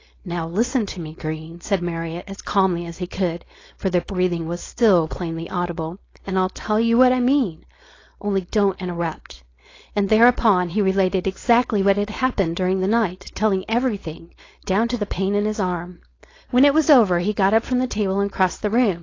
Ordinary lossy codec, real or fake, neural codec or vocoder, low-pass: AAC, 32 kbps; fake; codec, 16 kHz, 4.8 kbps, FACodec; 7.2 kHz